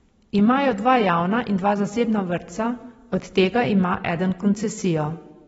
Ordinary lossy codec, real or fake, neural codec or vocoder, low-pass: AAC, 24 kbps; fake; vocoder, 44.1 kHz, 128 mel bands every 256 samples, BigVGAN v2; 19.8 kHz